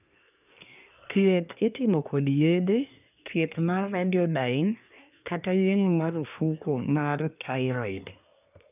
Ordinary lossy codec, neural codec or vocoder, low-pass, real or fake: none; codec, 24 kHz, 1 kbps, SNAC; 3.6 kHz; fake